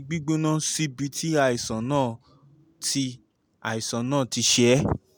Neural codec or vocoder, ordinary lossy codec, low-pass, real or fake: none; none; none; real